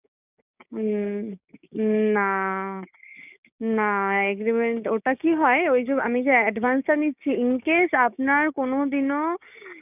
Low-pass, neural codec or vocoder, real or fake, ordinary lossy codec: 3.6 kHz; codec, 16 kHz, 6 kbps, DAC; fake; none